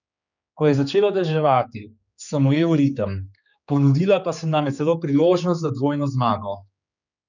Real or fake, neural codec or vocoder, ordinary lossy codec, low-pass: fake; codec, 16 kHz, 4 kbps, X-Codec, HuBERT features, trained on general audio; none; 7.2 kHz